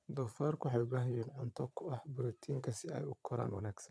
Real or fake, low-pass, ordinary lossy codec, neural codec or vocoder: fake; none; none; vocoder, 22.05 kHz, 80 mel bands, Vocos